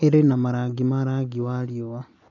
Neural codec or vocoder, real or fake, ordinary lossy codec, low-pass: none; real; none; 7.2 kHz